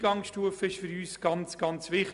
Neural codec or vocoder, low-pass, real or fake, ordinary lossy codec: none; 10.8 kHz; real; MP3, 96 kbps